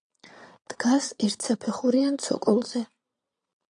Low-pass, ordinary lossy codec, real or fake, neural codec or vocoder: 9.9 kHz; AAC, 64 kbps; fake; vocoder, 22.05 kHz, 80 mel bands, Vocos